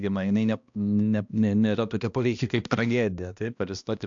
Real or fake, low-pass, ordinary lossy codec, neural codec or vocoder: fake; 7.2 kHz; AAC, 64 kbps; codec, 16 kHz, 1 kbps, X-Codec, HuBERT features, trained on balanced general audio